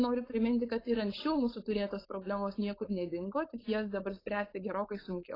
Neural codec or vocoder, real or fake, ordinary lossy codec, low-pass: codec, 16 kHz, 4.8 kbps, FACodec; fake; AAC, 24 kbps; 5.4 kHz